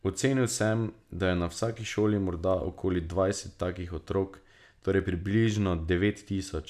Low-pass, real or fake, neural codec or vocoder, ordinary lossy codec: 14.4 kHz; real; none; none